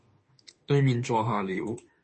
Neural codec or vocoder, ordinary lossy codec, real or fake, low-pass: autoencoder, 48 kHz, 32 numbers a frame, DAC-VAE, trained on Japanese speech; MP3, 32 kbps; fake; 10.8 kHz